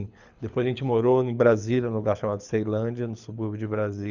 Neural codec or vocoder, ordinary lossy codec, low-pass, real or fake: codec, 24 kHz, 6 kbps, HILCodec; none; 7.2 kHz; fake